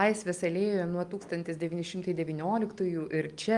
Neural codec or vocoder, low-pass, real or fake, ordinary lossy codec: none; 10.8 kHz; real; Opus, 32 kbps